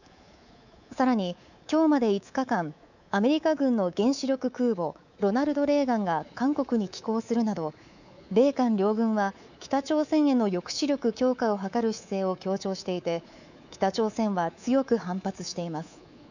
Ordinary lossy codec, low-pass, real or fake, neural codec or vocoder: none; 7.2 kHz; fake; codec, 24 kHz, 3.1 kbps, DualCodec